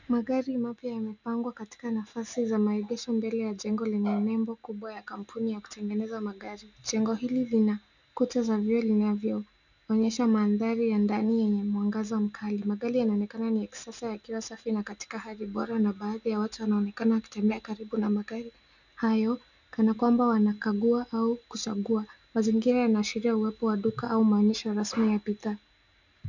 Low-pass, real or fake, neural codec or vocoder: 7.2 kHz; real; none